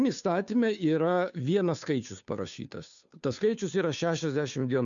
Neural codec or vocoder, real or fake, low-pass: codec, 16 kHz, 2 kbps, FunCodec, trained on Chinese and English, 25 frames a second; fake; 7.2 kHz